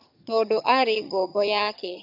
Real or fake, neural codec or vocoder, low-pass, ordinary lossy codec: fake; vocoder, 22.05 kHz, 80 mel bands, HiFi-GAN; 5.4 kHz; none